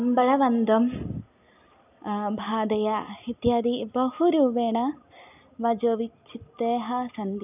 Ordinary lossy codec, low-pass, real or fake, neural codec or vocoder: none; 3.6 kHz; real; none